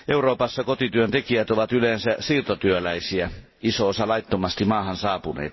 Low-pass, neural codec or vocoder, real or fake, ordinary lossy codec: 7.2 kHz; none; real; MP3, 24 kbps